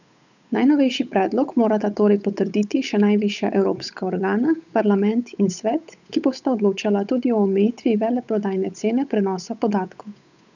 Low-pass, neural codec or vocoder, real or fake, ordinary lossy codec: 7.2 kHz; codec, 16 kHz, 8 kbps, FunCodec, trained on Chinese and English, 25 frames a second; fake; none